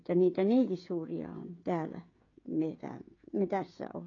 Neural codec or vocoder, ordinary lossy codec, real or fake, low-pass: codec, 16 kHz, 16 kbps, FreqCodec, smaller model; AAC, 32 kbps; fake; 7.2 kHz